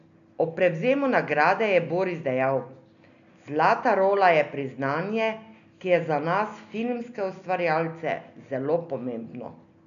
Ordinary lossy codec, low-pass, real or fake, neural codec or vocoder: none; 7.2 kHz; real; none